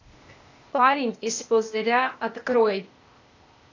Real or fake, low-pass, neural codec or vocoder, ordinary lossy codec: fake; 7.2 kHz; codec, 16 kHz, 0.8 kbps, ZipCodec; AAC, 48 kbps